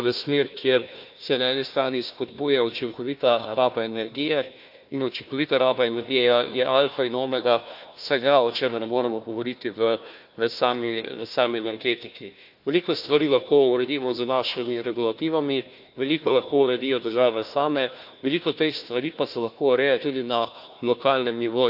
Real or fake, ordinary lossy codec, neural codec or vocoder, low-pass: fake; AAC, 48 kbps; codec, 16 kHz, 1 kbps, FunCodec, trained on Chinese and English, 50 frames a second; 5.4 kHz